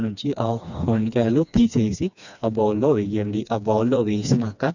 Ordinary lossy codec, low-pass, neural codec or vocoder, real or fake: none; 7.2 kHz; codec, 16 kHz, 2 kbps, FreqCodec, smaller model; fake